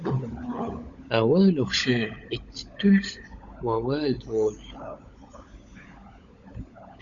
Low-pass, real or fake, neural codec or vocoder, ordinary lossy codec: 7.2 kHz; fake; codec, 16 kHz, 16 kbps, FunCodec, trained on LibriTTS, 50 frames a second; Opus, 64 kbps